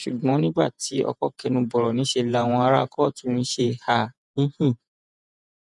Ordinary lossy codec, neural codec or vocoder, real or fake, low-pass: none; vocoder, 48 kHz, 128 mel bands, Vocos; fake; 10.8 kHz